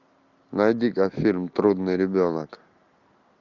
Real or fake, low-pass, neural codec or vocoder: real; 7.2 kHz; none